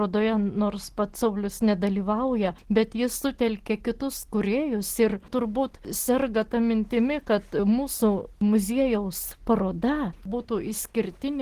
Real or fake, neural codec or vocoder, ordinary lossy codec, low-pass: real; none; Opus, 16 kbps; 14.4 kHz